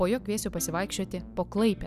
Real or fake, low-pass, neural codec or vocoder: real; 14.4 kHz; none